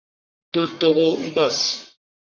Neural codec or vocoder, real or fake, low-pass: codec, 44.1 kHz, 1.7 kbps, Pupu-Codec; fake; 7.2 kHz